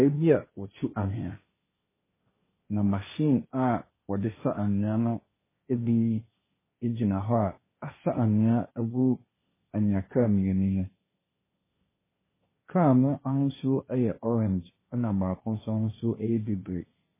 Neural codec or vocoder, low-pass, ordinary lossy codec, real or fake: codec, 16 kHz, 1.1 kbps, Voila-Tokenizer; 3.6 kHz; MP3, 16 kbps; fake